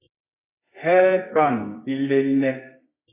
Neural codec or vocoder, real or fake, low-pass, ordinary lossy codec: codec, 24 kHz, 0.9 kbps, WavTokenizer, medium music audio release; fake; 3.6 kHz; AAC, 24 kbps